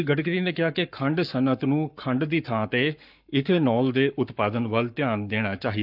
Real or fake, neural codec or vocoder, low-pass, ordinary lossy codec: fake; codec, 44.1 kHz, 7.8 kbps, Pupu-Codec; 5.4 kHz; none